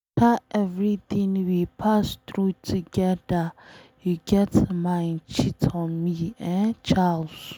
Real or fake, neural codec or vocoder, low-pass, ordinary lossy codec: real; none; 19.8 kHz; none